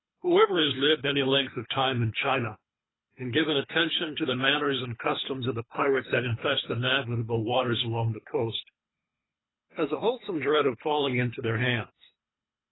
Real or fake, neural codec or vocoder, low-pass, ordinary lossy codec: fake; codec, 24 kHz, 3 kbps, HILCodec; 7.2 kHz; AAC, 16 kbps